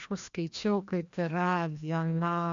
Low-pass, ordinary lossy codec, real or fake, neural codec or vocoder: 7.2 kHz; MP3, 64 kbps; fake; codec, 16 kHz, 1 kbps, FreqCodec, larger model